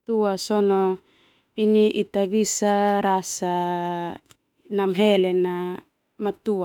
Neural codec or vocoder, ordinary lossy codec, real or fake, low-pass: autoencoder, 48 kHz, 32 numbers a frame, DAC-VAE, trained on Japanese speech; none; fake; 19.8 kHz